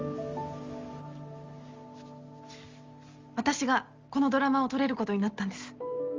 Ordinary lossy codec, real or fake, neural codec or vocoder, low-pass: Opus, 32 kbps; real; none; 7.2 kHz